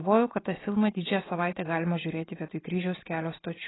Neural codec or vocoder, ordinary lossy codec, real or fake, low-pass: none; AAC, 16 kbps; real; 7.2 kHz